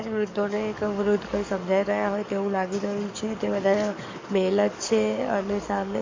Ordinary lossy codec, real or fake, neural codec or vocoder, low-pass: MP3, 64 kbps; fake; codec, 44.1 kHz, 7.8 kbps, DAC; 7.2 kHz